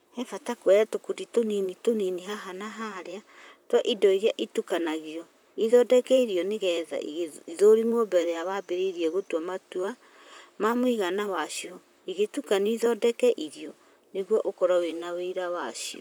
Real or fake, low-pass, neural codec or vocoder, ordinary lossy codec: fake; none; vocoder, 44.1 kHz, 128 mel bands, Pupu-Vocoder; none